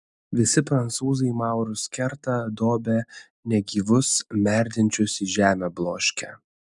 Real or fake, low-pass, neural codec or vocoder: real; 10.8 kHz; none